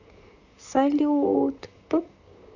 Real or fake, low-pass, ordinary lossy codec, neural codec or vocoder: fake; 7.2 kHz; none; vocoder, 44.1 kHz, 128 mel bands, Pupu-Vocoder